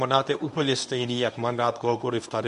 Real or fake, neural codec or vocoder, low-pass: fake; codec, 24 kHz, 0.9 kbps, WavTokenizer, medium speech release version 1; 10.8 kHz